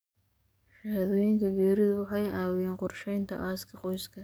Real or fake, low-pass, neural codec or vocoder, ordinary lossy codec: fake; none; codec, 44.1 kHz, 7.8 kbps, DAC; none